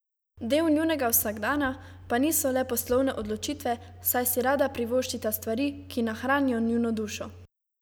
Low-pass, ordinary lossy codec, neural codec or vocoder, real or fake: none; none; none; real